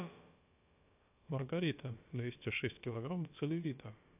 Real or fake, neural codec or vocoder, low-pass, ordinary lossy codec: fake; codec, 16 kHz, about 1 kbps, DyCAST, with the encoder's durations; 3.6 kHz; AAC, 24 kbps